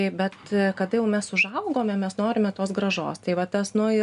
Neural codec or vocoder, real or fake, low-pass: none; real; 10.8 kHz